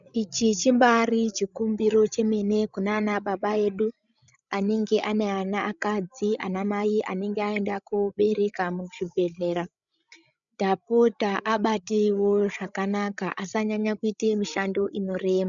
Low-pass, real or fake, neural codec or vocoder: 7.2 kHz; fake; codec, 16 kHz, 16 kbps, FreqCodec, larger model